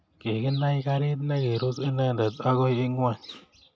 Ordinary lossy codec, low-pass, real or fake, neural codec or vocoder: none; none; real; none